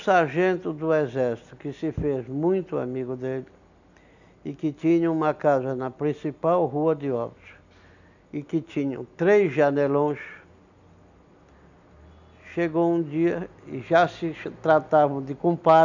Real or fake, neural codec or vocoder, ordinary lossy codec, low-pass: real; none; none; 7.2 kHz